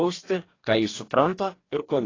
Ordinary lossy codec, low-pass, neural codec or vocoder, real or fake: AAC, 32 kbps; 7.2 kHz; codec, 44.1 kHz, 2.6 kbps, DAC; fake